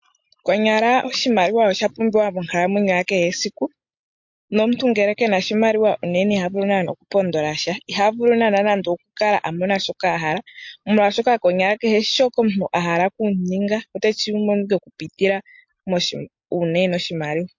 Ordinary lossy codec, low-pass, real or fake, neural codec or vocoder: MP3, 48 kbps; 7.2 kHz; real; none